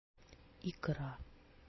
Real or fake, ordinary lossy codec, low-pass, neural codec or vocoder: real; MP3, 24 kbps; 7.2 kHz; none